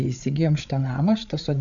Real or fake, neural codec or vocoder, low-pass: fake; codec, 16 kHz, 8 kbps, FreqCodec, larger model; 7.2 kHz